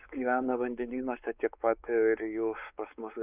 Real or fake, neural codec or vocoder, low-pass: fake; codec, 16 kHz, 4 kbps, X-Codec, WavLM features, trained on Multilingual LibriSpeech; 3.6 kHz